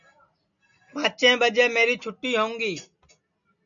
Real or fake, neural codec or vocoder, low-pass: real; none; 7.2 kHz